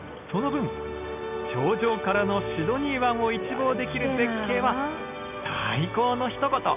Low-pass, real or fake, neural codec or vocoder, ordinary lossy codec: 3.6 kHz; real; none; none